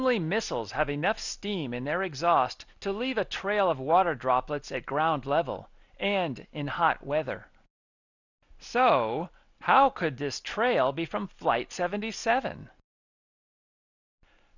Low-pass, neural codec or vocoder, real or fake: 7.2 kHz; none; real